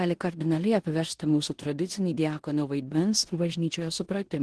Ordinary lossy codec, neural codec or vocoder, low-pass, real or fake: Opus, 16 kbps; codec, 16 kHz in and 24 kHz out, 0.9 kbps, LongCat-Audio-Codec, four codebook decoder; 10.8 kHz; fake